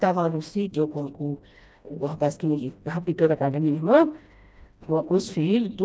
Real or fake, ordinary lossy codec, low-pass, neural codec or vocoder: fake; none; none; codec, 16 kHz, 1 kbps, FreqCodec, smaller model